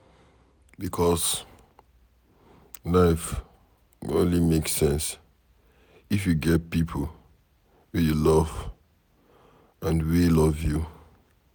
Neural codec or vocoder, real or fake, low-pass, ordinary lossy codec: none; real; none; none